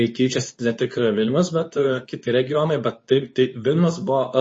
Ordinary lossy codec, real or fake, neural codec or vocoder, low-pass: MP3, 32 kbps; fake; codec, 24 kHz, 0.9 kbps, WavTokenizer, medium speech release version 2; 9.9 kHz